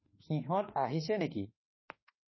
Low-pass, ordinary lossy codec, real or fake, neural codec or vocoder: 7.2 kHz; MP3, 24 kbps; fake; autoencoder, 48 kHz, 32 numbers a frame, DAC-VAE, trained on Japanese speech